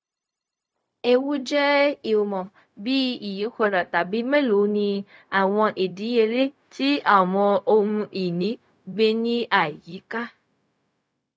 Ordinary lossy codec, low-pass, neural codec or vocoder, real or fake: none; none; codec, 16 kHz, 0.4 kbps, LongCat-Audio-Codec; fake